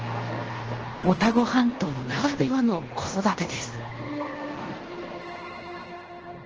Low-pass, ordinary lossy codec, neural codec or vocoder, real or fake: 7.2 kHz; Opus, 16 kbps; codec, 16 kHz in and 24 kHz out, 0.9 kbps, LongCat-Audio-Codec, fine tuned four codebook decoder; fake